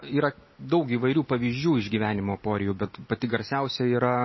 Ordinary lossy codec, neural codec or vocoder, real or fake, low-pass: MP3, 24 kbps; none; real; 7.2 kHz